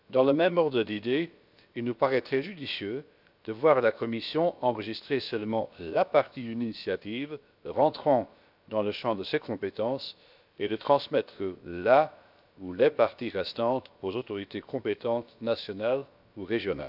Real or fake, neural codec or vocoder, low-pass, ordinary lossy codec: fake; codec, 16 kHz, about 1 kbps, DyCAST, with the encoder's durations; 5.4 kHz; none